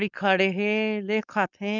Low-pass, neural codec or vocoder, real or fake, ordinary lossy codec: 7.2 kHz; codec, 16 kHz, 4.8 kbps, FACodec; fake; none